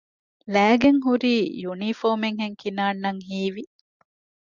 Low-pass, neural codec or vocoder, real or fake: 7.2 kHz; none; real